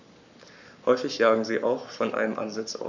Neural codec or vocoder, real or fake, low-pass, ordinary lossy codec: codec, 44.1 kHz, 7.8 kbps, Pupu-Codec; fake; 7.2 kHz; none